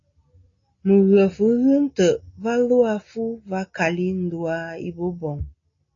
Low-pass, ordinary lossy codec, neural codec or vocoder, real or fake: 7.2 kHz; AAC, 32 kbps; none; real